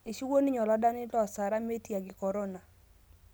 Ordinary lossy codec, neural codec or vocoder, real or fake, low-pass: none; none; real; none